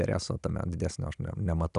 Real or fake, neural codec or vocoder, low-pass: real; none; 10.8 kHz